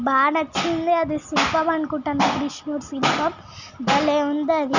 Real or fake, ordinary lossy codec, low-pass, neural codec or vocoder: real; none; 7.2 kHz; none